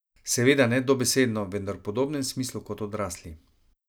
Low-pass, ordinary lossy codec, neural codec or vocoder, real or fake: none; none; none; real